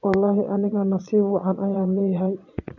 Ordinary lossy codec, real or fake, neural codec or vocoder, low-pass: none; fake; vocoder, 22.05 kHz, 80 mel bands, Vocos; 7.2 kHz